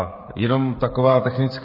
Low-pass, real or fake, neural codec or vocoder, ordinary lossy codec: 5.4 kHz; fake; codec, 16 kHz, 16 kbps, FreqCodec, smaller model; MP3, 24 kbps